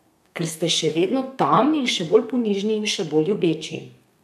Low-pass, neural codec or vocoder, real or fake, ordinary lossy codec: 14.4 kHz; codec, 32 kHz, 1.9 kbps, SNAC; fake; none